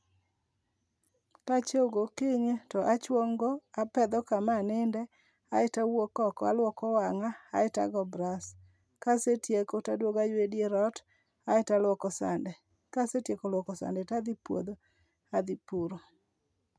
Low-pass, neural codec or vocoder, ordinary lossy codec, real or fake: none; none; none; real